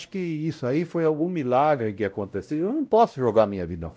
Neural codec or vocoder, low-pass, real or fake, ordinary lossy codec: codec, 16 kHz, 0.5 kbps, X-Codec, WavLM features, trained on Multilingual LibriSpeech; none; fake; none